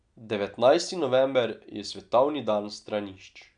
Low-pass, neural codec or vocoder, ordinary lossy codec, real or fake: 9.9 kHz; none; none; real